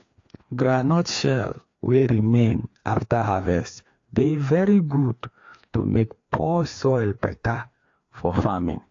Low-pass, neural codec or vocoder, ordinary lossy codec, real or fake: 7.2 kHz; codec, 16 kHz, 2 kbps, FreqCodec, larger model; AAC, 48 kbps; fake